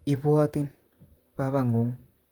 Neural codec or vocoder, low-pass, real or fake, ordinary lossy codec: vocoder, 44.1 kHz, 128 mel bands, Pupu-Vocoder; 19.8 kHz; fake; Opus, 32 kbps